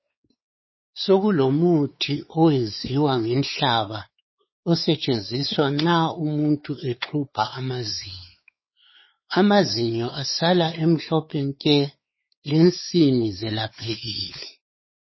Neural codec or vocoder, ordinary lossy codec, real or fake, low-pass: codec, 16 kHz, 4 kbps, X-Codec, WavLM features, trained on Multilingual LibriSpeech; MP3, 24 kbps; fake; 7.2 kHz